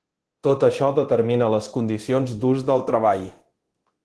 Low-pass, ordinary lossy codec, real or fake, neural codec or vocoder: 10.8 kHz; Opus, 16 kbps; fake; codec, 24 kHz, 0.9 kbps, DualCodec